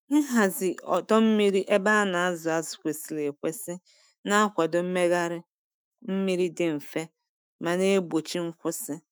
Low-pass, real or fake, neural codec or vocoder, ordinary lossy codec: none; fake; autoencoder, 48 kHz, 128 numbers a frame, DAC-VAE, trained on Japanese speech; none